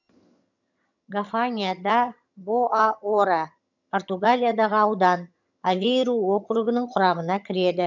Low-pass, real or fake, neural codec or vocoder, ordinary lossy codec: 7.2 kHz; fake; vocoder, 22.05 kHz, 80 mel bands, HiFi-GAN; none